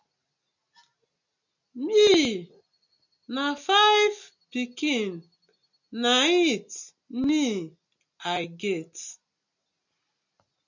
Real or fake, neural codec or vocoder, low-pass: real; none; 7.2 kHz